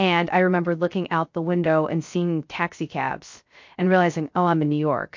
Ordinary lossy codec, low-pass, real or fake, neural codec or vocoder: MP3, 48 kbps; 7.2 kHz; fake; codec, 16 kHz, 0.3 kbps, FocalCodec